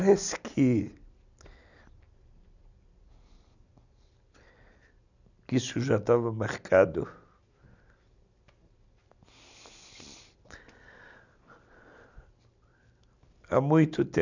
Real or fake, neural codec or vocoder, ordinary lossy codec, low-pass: real; none; none; 7.2 kHz